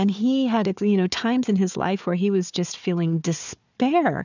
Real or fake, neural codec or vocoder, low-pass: fake; codec, 16 kHz, 4 kbps, FunCodec, trained on Chinese and English, 50 frames a second; 7.2 kHz